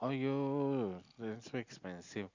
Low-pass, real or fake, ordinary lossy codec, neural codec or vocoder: 7.2 kHz; real; Opus, 64 kbps; none